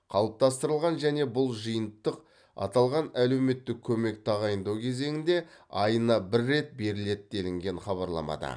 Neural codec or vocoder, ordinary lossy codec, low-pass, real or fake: none; none; 9.9 kHz; real